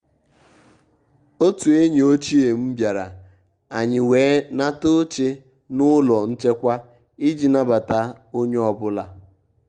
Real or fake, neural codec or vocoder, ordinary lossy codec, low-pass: real; none; Opus, 32 kbps; 9.9 kHz